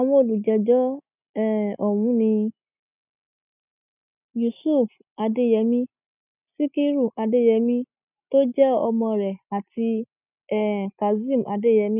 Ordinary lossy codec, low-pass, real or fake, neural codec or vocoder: none; 3.6 kHz; real; none